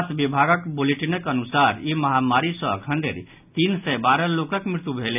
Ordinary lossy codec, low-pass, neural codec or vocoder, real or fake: none; 3.6 kHz; none; real